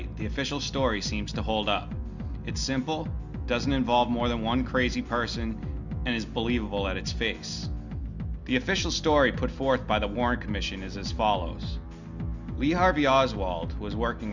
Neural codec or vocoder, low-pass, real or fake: none; 7.2 kHz; real